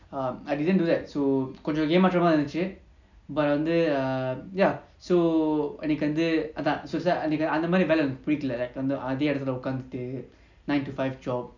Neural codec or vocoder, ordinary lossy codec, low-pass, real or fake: none; none; 7.2 kHz; real